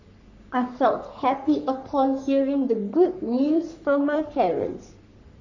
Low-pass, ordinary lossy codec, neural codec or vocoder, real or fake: 7.2 kHz; none; codec, 44.1 kHz, 3.4 kbps, Pupu-Codec; fake